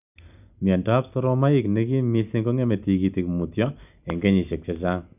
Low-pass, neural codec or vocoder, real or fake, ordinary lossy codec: 3.6 kHz; none; real; none